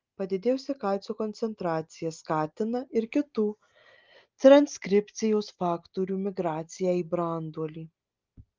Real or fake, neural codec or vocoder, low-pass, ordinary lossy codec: real; none; 7.2 kHz; Opus, 32 kbps